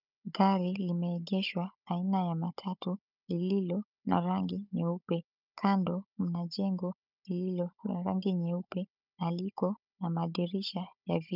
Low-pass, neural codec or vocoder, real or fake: 5.4 kHz; codec, 16 kHz, 16 kbps, FunCodec, trained on Chinese and English, 50 frames a second; fake